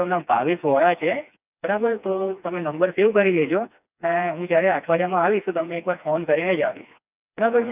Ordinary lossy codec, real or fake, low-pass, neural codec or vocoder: none; fake; 3.6 kHz; codec, 16 kHz, 2 kbps, FreqCodec, smaller model